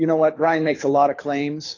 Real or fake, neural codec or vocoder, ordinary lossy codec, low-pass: fake; codec, 24 kHz, 6 kbps, HILCodec; AAC, 32 kbps; 7.2 kHz